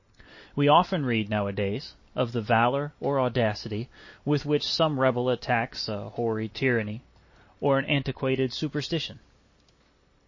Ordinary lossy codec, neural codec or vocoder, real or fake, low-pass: MP3, 32 kbps; none; real; 7.2 kHz